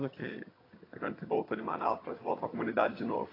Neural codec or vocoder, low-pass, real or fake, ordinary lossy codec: vocoder, 22.05 kHz, 80 mel bands, HiFi-GAN; 5.4 kHz; fake; AAC, 24 kbps